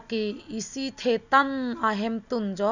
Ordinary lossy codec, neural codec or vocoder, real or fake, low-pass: none; none; real; 7.2 kHz